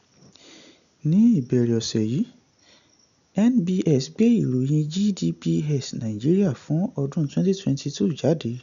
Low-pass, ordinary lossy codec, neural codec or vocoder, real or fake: 7.2 kHz; none; none; real